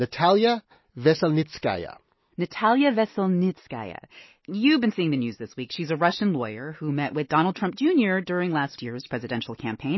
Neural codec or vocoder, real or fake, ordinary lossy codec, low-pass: none; real; MP3, 24 kbps; 7.2 kHz